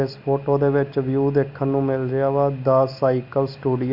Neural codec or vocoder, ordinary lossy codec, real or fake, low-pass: none; none; real; 5.4 kHz